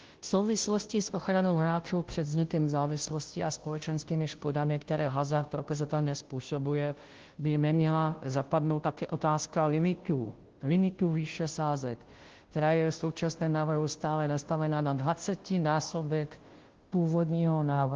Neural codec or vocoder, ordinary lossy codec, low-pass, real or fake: codec, 16 kHz, 0.5 kbps, FunCodec, trained on Chinese and English, 25 frames a second; Opus, 16 kbps; 7.2 kHz; fake